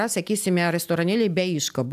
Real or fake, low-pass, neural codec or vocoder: real; 14.4 kHz; none